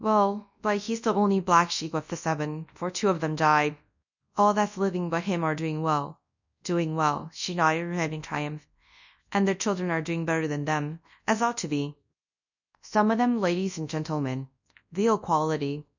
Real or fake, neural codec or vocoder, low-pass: fake; codec, 24 kHz, 0.9 kbps, WavTokenizer, large speech release; 7.2 kHz